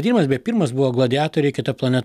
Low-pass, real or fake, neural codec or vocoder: 14.4 kHz; real; none